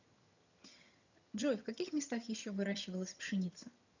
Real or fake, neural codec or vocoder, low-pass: fake; vocoder, 22.05 kHz, 80 mel bands, HiFi-GAN; 7.2 kHz